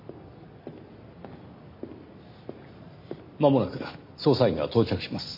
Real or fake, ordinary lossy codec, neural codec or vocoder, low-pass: real; MP3, 32 kbps; none; 5.4 kHz